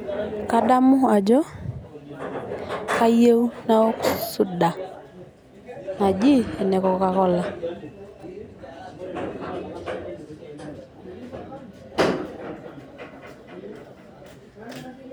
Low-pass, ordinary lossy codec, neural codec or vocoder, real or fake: none; none; none; real